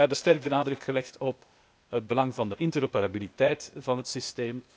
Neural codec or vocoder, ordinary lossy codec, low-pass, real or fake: codec, 16 kHz, 0.8 kbps, ZipCodec; none; none; fake